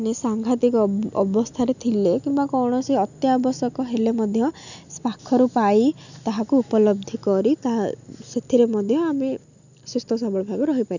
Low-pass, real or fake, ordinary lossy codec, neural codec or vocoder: 7.2 kHz; real; none; none